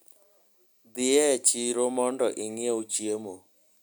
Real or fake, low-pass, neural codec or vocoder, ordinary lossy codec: real; none; none; none